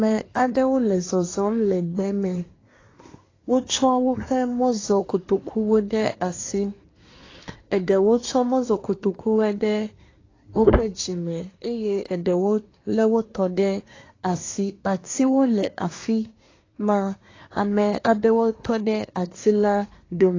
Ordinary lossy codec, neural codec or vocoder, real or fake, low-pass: AAC, 32 kbps; codec, 24 kHz, 1 kbps, SNAC; fake; 7.2 kHz